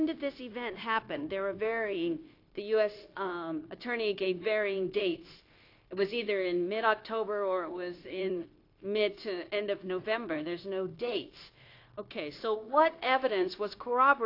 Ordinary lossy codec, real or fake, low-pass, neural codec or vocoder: AAC, 32 kbps; fake; 5.4 kHz; codec, 16 kHz, 0.9 kbps, LongCat-Audio-Codec